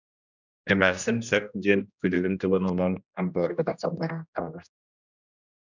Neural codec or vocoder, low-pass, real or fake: codec, 16 kHz, 1 kbps, X-Codec, HuBERT features, trained on general audio; 7.2 kHz; fake